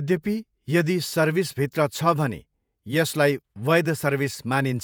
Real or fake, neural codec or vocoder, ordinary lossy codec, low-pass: fake; vocoder, 48 kHz, 128 mel bands, Vocos; none; none